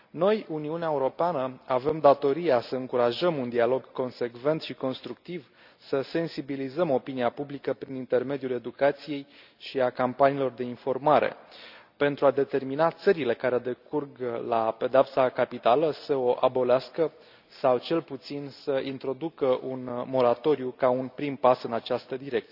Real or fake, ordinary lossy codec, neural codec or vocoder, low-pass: real; none; none; 5.4 kHz